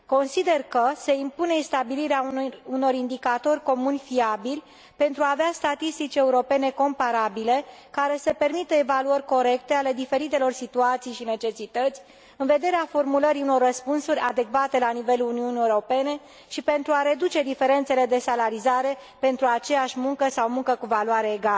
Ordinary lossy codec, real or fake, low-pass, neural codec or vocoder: none; real; none; none